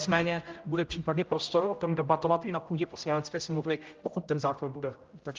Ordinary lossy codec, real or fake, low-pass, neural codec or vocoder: Opus, 32 kbps; fake; 7.2 kHz; codec, 16 kHz, 0.5 kbps, X-Codec, HuBERT features, trained on general audio